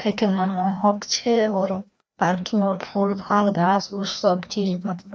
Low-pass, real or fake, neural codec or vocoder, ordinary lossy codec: none; fake; codec, 16 kHz, 1 kbps, FreqCodec, larger model; none